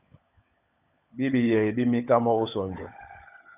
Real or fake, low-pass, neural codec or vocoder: fake; 3.6 kHz; codec, 16 kHz, 16 kbps, FunCodec, trained on LibriTTS, 50 frames a second